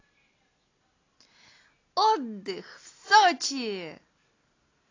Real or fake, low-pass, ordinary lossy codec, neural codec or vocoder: real; 7.2 kHz; AAC, 32 kbps; none